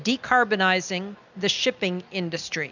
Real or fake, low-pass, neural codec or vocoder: real; 7.2 kHz; none